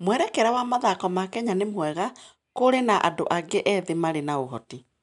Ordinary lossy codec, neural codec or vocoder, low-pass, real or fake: none; none; 10.8 kHz; real